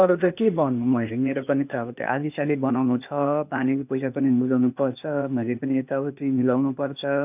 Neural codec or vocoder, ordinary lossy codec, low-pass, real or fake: codec, 16 kHz, 0.8 kbps, ZipCodec; none; 3.6 kHz; fake